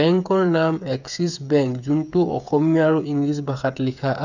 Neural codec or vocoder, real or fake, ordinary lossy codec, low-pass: codec, 16 kHz, 8 kbps, FreqCodec, smaller model; fake; none; 7.2 kHz